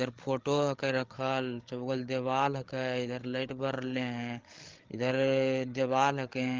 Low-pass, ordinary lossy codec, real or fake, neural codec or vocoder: 7.2 kHz; Opus, 16 kbps; fake; codec, 16 kHz, 8 kbps, FreqCodec, larger model